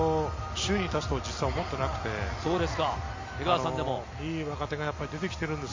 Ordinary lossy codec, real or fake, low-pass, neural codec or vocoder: MP3, 48 kbps; real; 7.2 kHz; none